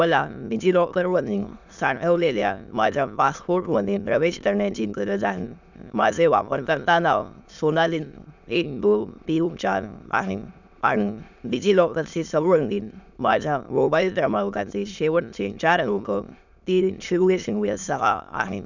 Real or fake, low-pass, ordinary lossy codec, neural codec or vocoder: fake; 7.2 kHz; none; autoencoder, 22.05 kHz, a latent of 192 numbers a frame, VITS, trained on many speakers